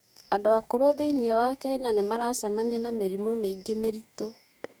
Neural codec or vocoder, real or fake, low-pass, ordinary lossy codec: codec, 44.1 kHz, 2.6 kbps, DAC; fake; none; none